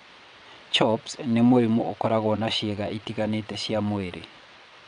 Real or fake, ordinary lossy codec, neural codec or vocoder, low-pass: fake; none; vocoder, 22.05 kHz, 80 mel bands, WaveNeXt; 9.9 kHz